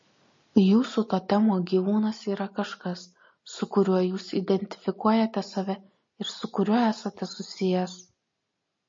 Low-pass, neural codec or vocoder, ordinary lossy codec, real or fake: 7.2 kHz; none; MP3, 32 kbps; real